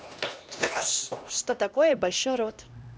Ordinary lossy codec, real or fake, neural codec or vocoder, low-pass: none; fake; codec, 16 kHz, 1 kbps, X-Codec, HuBERT features, trained on LibriSpeech; none